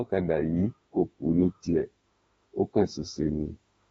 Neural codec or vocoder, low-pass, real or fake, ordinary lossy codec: autoencoder, 48 kHz, 32 numbers a frame, DAC-VAE, trained on Japanese speech; 19.8 kHz; fake; AAC, 24 kbps